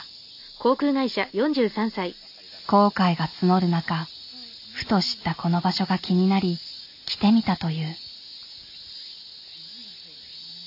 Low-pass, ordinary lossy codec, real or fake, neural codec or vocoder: 5.4 kHz; AAC, 48 kbps; real; none